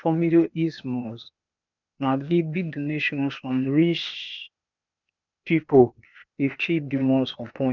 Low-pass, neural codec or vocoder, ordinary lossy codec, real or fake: 7.2 kHz; codec, 16 kHz, 0.8 kbps, ZipCodec; none; fake